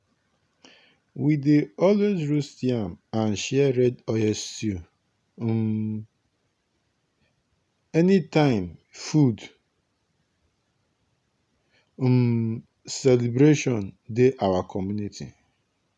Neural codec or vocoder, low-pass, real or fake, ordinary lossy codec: none; none; real; none